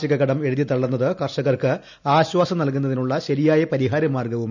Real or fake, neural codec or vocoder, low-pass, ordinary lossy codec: real; none; 7.2 kHz; none